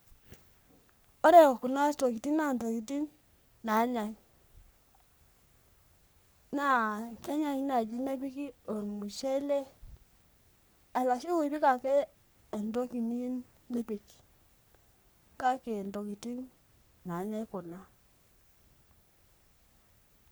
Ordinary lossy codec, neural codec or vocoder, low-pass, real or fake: none; codec, 44.1 kHz, 3.4 kbps, Pupu-Codec; none; fake